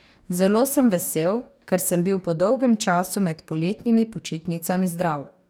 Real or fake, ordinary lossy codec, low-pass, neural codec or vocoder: fake; none; none; codec, 44.1 kHz, 2.6 kbps, DAC